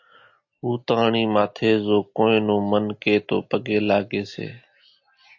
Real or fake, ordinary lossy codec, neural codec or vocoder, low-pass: real; AAC, 48 kbps; none; 7.2 kHz